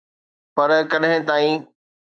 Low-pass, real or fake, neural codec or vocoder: 9.9 kHz; fake; autoencoder, 48 kHz, 128 numbers a frame, DAC-VAE, trained on Japanese speech